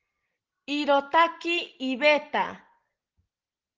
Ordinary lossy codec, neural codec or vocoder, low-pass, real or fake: Opus, 16 kbps; none; 7.2 kHz; real